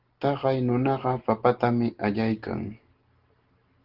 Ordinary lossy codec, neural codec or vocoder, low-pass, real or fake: Opus, 16 kbps; none; 5.4 kHz; real